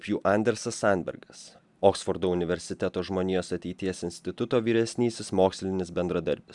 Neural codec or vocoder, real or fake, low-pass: none; real; 10.8 kHz